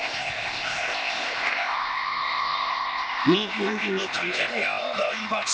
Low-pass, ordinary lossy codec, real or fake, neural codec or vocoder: none; none; fake; codec, 16 kHz, 0.8 kbps, ZipCodec